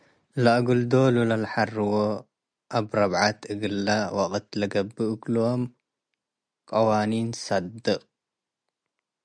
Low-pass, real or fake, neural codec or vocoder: 9.9 kHz; real; none